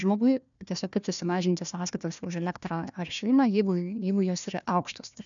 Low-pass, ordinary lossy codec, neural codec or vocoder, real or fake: 7.2 kHz; MP3, 96 kbps; codec, 16 kHz, 1 kbps, FunCodec, trained on Chinese and English, 50 frames a second; fake